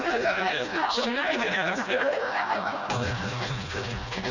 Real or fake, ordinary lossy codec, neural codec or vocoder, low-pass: fake; none; codec, 16 kHz, 1 kbps, FreqCodec, smaller model; 7.2 kHz